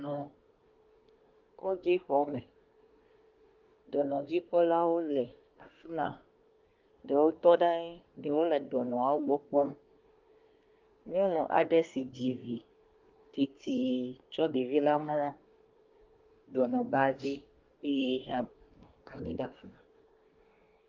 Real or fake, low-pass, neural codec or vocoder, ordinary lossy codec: fake; 7.2 kHz; codec, 24 kHz, 1 kbps, SNAC; Opus, 32 kbps